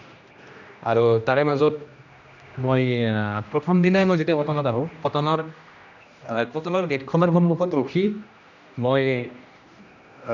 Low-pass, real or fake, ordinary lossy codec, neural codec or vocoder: 7.2 kHz; fake; none; codec, 16 kHz, 1 kbps, X-Codec, HuBERT features, trained on general audio